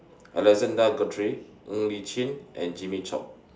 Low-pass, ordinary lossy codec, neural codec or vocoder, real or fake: none; none; none; real